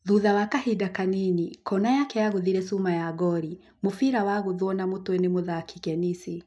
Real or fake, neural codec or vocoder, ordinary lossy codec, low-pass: real; none; none; none